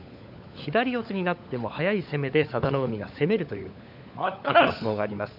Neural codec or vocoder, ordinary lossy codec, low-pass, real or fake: codec, 16 kHz, 16 kbps, FunCodec, trained on LibriTTS, 50 frames a second; none; 5.4 kHz; fake